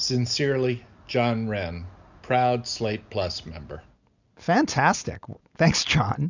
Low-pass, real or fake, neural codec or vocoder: 7.2 kHz; real; none